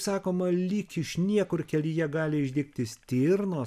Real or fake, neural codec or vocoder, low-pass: real; none; 14.4 kHz